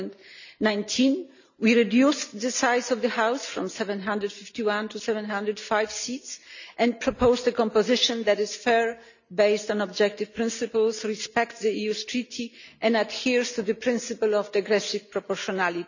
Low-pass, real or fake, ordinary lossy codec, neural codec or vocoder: 7.2 kHz; real; none; none